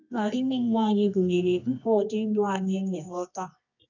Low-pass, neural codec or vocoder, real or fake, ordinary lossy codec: 7.2 kHz; codec, 24 kHz, 0.9 kbps, WavTokenizer, medium music audio release; fake; none